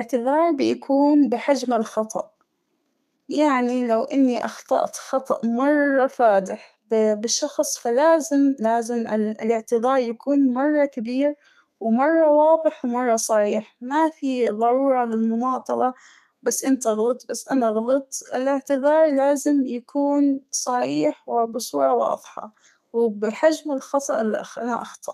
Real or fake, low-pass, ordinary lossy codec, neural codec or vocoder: fake; 14.4 kHz; none; codec, 32 kHz, 1.9 kbps, SNAC